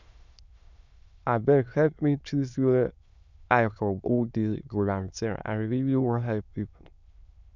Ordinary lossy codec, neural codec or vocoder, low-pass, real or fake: none; autoencoder, 22.05 kHz, a latent of 192 numbers a frame, VITS, trained on many speakers; 7.2 kHz; fake